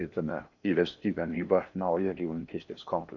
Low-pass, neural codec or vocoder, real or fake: 7.2 kHz; codec, 16 kHz in and 24 kHz out, 0.8 kbps, FocalCodec, streaming, 65536 codes; fake